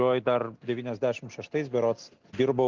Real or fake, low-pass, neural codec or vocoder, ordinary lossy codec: real; 7.2 kHz; none; Opus, 24 kbps